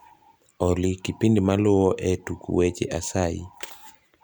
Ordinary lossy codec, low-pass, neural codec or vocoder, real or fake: none; none; none; real